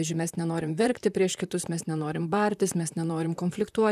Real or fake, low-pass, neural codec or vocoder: fake; 14.4 kHz; vocoder, 44.1 kHz, 128 mel bands, Pupu-Vocoder